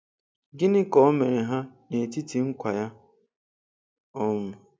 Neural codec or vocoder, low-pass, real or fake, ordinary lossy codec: none; none; real; none